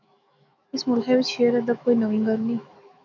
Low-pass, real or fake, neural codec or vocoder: 7.2 kHz; fake; autoencoder, 48 kHz, 128 numbers a frame, DAC-VAE, trained on Japanese speech